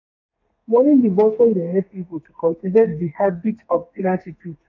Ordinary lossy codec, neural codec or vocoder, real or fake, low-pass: AAC, 48 kbps; codec, 32 kHz, 1.9 kbps, SNAC; fake; 7.2 kHz